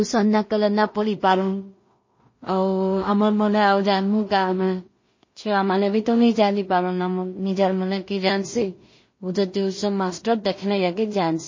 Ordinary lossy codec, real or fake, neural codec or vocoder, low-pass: MP3, 32 kbps; fake; codec, 16 kHz in and 24 kHz out, 0.4 kbps, LongCat-Audio-Codec, two codebook decoder; 7.2 kHz